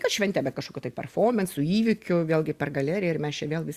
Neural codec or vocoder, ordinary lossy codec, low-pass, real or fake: none; Opus, 64 kbps; 14.4 kHz; real